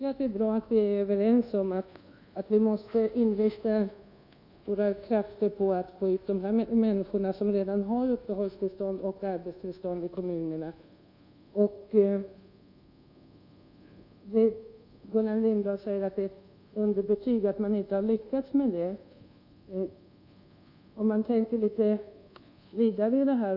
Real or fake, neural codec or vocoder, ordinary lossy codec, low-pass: fake; codec, 24 kHz, 1.2 kbps, DualCodec; none; 5.4 kHz